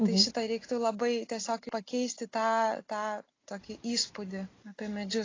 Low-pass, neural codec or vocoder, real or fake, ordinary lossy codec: 7.2 kHz; none; real; AAC, 32 kbps